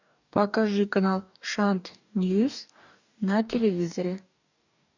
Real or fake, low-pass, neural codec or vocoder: fake; 7.2 kHz; codec, 44.1 kHz, 2.6 kbps, DAC